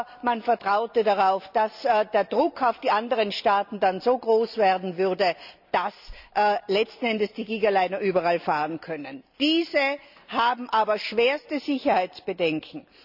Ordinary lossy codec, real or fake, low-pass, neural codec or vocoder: none; real; 5.4 kHz; none